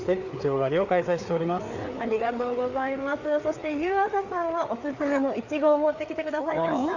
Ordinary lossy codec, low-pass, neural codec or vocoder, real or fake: none; 7.2 kHz; codec, 16 kHz, 4 kbps, FreqCodec, larger model; fake